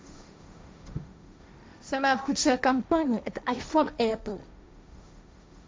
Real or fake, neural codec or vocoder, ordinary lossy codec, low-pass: fake; codec, 16 kHz, 1.1 kbps, Voila-Tokenizer; none; none